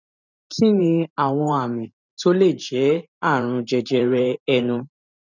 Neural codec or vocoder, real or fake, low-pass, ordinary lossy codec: vocoder, 44.1 kHz, 128 mel bands every 512 samples, BigVGAN v2; fake; 7.2 kHz; none